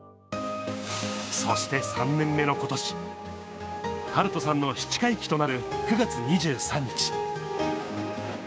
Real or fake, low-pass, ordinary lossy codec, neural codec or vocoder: fake; none; none; codec, 16 kHz, 6 kbps, DAC